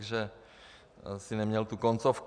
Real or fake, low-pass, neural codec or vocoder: real; 9.9 kHz; none